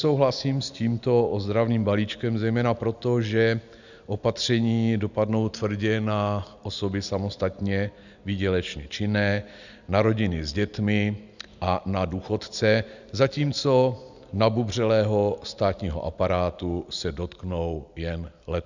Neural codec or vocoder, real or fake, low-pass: none; real; 7.2 kHz